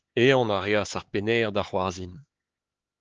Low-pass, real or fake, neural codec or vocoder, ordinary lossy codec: 7.2 kHz; fake; codec, 16 kHz, 4 kbps, X-Codec, HuBERT features, trained on LibriSpeech; Opus, 16 kbps